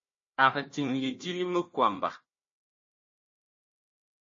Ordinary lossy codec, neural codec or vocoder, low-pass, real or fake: MP3, 32 kbps; codec, 16 kHz, 1 kbps, FunCodec, trained on Chinese and English, 50 frames a second; 7.2 kHz; fake